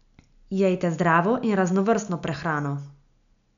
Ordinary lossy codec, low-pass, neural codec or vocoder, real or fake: none; 7.2 kHz; none; real